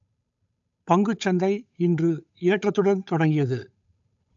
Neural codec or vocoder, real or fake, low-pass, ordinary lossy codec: codec, 16 kHz, 8 kbps, FunCodec, trained on Chinese and English, 25 frames a second; fake; 7.2 kHz; AAC, 64 kbps